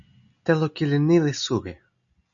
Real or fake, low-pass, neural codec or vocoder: real; 7.2 kHz; none